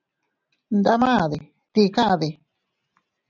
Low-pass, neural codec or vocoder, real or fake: 7.2 kHz; none; real